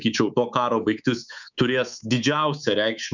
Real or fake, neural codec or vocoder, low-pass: fake; codec, 24 kHz, 3.1 kbps, DualCodec; 7.2 kHz